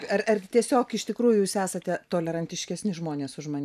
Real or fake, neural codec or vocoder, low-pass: fake; vocoder, 44.1 kHz, 128 mel bands every 512 samples, BigVGAN v2; 14.4 kHz